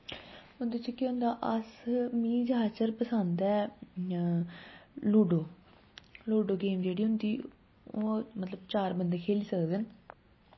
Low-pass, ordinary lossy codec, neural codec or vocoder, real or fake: 7.2 kHz; MP3, 24 kbps; none; real